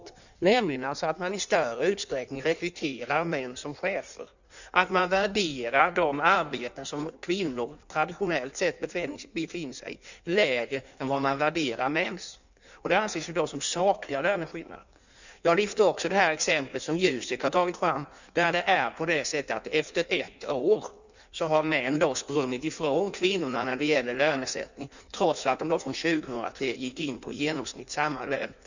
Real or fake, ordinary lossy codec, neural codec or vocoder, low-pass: fake; none; codec, 16 kHz in and 24 kHz out, 1.1 kbps, FireRedTTS-2 codec; 7.2 kHz